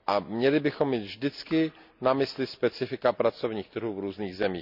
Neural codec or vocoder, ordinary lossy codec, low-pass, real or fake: none; none; 5.4 kHz; real